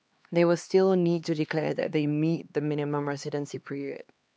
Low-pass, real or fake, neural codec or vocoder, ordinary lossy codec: none; fake; codec, 16 kHz, 2 kbps, X-Codec, HuBERT features, trained on LibriSpeech; none